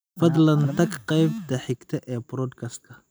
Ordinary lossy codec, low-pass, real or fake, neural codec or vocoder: none; none; real; none